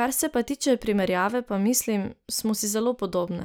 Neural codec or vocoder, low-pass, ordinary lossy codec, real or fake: none; none; none; real